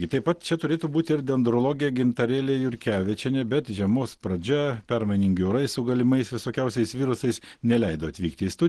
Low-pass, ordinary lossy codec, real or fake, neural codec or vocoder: 10.8 kHz; Opus, 16 kbps; real; none